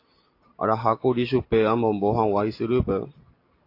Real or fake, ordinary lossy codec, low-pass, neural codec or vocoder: real; AAC, 32 kbps; 5.4 kHz; none